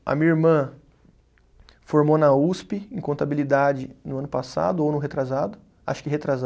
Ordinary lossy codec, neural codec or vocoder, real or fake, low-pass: none; none; real; none